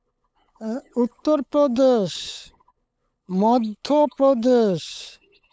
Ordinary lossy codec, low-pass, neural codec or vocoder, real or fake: none; none; codec, 16 kHz, 8 kbps, FunCodec, trained on LibriTTS, 25 frames a second; fake